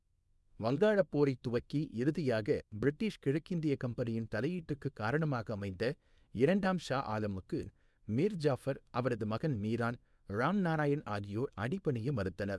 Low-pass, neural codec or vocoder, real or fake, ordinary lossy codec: none; codec, 24 kHz, 0.9 kbps, WavTokenizer, small release; fake; none